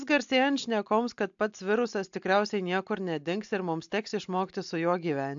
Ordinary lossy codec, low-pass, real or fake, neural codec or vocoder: AAC, 64 kbps; 7.2 kHz; real; none